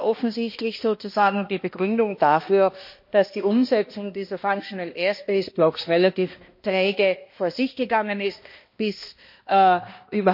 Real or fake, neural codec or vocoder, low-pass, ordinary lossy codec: fake; codec, 16 kHz, 1 kbps, X-Codec, HuBERT features, trained on balanced general audio; 5.4 kHz; MP3, 32 kbps